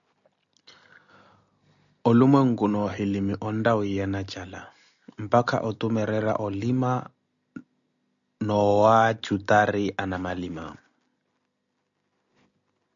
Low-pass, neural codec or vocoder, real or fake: 7.2 kHz; none; real